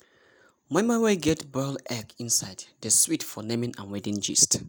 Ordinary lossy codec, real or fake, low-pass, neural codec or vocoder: none; real; none; none